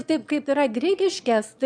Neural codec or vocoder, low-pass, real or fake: autoencoder, 22.05 kHz, a latent of 192 numbers a frame, VITS, trained on one speaker; 9.9 kHz; fake